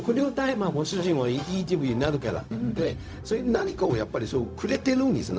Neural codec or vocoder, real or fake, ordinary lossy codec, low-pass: codec, 16 kHz, 0.4 kbps, LongCat-Audio-Codec; fake; none; none